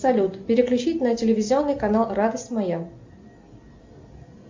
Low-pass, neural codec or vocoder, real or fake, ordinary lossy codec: 7.2 kHz; none; real; MP3, 64 kbps